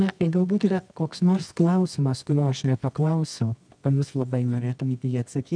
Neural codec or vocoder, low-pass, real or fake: codec, 24 kHz, 0.9 kbps, WavTokenizer, medium music audio release; 9.9 kHz; fake